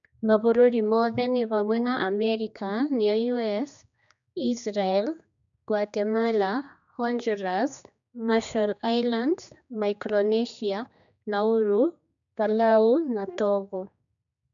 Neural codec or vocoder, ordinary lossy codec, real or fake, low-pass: codec, 16 kHz, 2 kbps, X-Codec, HuBERT features, trained on general audio; MP3, 96 kbps; fake; 7.2 kHz